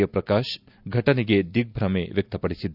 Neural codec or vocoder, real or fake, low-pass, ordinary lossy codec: none; real; 5.4 kHz; none